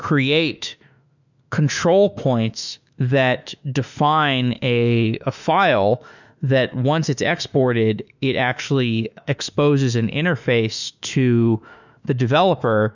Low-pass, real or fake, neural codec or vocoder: 7.2 kHz; fake; autoencoder, 48 kHz, 32 numbers a frame, DAC-VAE, trained on Japanese speech